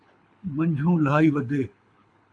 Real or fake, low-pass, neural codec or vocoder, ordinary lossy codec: fake; 9.9 kHz; codec, 24 kHz, 6 kbps, HILCodec; AAC, 64 kbps